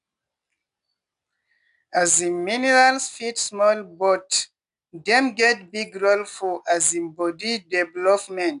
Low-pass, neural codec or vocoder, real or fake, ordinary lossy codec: 10.8 kHz; none; real; none